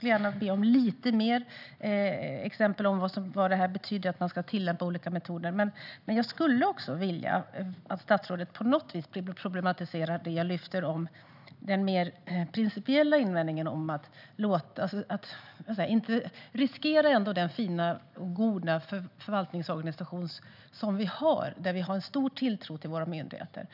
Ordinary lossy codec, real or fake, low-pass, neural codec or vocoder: none; real; 5.4 kHz; none